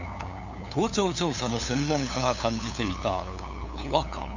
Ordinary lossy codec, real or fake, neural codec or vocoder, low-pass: none; fake; codec, 16 kHz, 2 kbps, FunCodec, trained on LibriTTS, 25 frames a second; 7.2 kHz